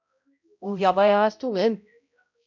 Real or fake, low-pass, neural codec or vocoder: fake; 7.2 kHz; codec, 16 kHz, 0.5 kbps, X-Codec, HuBERT features, trained on balanced general audio